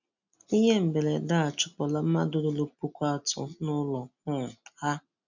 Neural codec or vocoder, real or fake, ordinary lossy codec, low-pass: none; real; none; 7.2 kHz